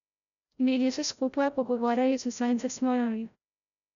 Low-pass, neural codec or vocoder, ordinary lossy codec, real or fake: 7.2 kHz; codec, 16 kHz, 0.5 kbps, FreqCodec, larger model; none; fake